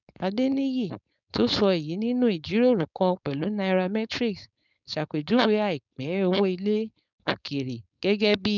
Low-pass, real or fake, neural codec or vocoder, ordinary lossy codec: 7.2 kHz; fake; codec, 16 kHz, 4.8 kbps, FACodec; none